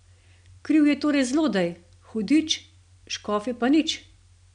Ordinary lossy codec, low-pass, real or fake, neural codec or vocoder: none; 9.9 kHz; real; none